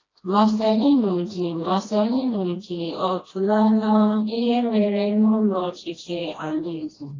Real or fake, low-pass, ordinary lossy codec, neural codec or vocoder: fake; 7.2 kHz; AAC, 32 kbps; codec, 16 kHz, 1 kbps, FreqCodec, smaller model